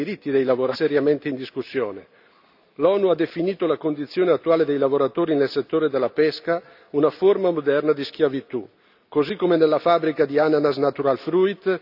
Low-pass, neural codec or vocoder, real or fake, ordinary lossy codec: 5.4 kHz; none; real; none